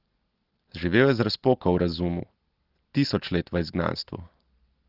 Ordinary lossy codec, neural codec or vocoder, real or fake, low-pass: Opus, 16 kbps; none; real; 5.4 kHz